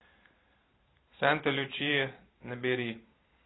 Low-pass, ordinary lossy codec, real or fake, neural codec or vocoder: 7.2 kHz; AAC, 16 kbps; real; none